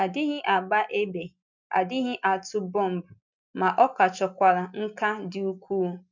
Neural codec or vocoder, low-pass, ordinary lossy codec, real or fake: none; 7.2 kHz; none; real